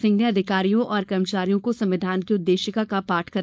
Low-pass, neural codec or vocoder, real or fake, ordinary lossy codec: none; codec, 16 kHz, 4.8 kbps, FACodec; fake; none